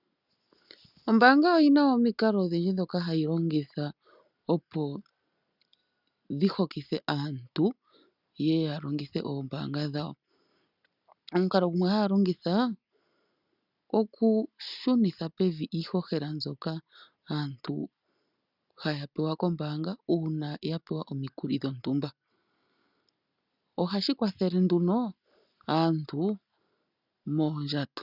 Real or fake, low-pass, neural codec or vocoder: real; 5.4 kHz; none